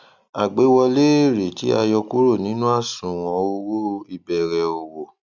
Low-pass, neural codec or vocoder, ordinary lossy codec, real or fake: 7.2 kHz; none; none; real